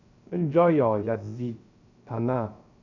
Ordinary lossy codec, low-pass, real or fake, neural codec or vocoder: none; 7.2 kHz; fake; codec, 16 kHz, 0.3 kbps, FocalCodec